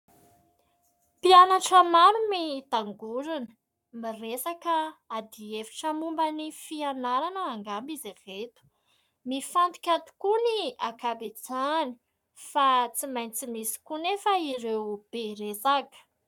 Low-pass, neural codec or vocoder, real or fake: 19.8 kHz; codec, 44.1 kHz, 7.8 kbps, Pupu-Codec; fake